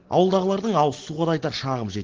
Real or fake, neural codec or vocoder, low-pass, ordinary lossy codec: real; none; 7.2 kHz; Opus, 16 kbps